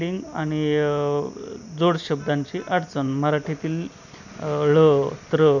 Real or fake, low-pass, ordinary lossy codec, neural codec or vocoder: real; 7.2 kHz; none; none